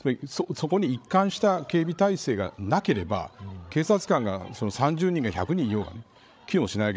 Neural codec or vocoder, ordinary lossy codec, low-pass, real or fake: codec, 16 kHz, 16 kbps, FreqCodec, larger model; none; none; fake